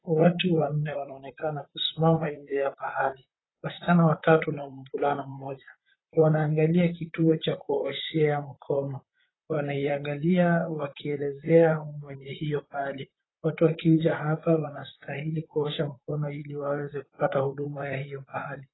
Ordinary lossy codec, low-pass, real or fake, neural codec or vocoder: AAC, 16 kbps; 7.2 kHz; fake; vocoder, 44.1 kHz, 128 mel bands, Pupu-Vocoder